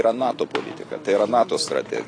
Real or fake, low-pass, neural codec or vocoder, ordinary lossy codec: real; 9.9 kHz; none; AAC, 32 kbps